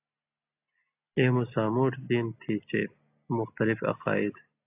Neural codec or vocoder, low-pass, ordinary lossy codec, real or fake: none; 3.6 kHz; AAC, 32 kbps; real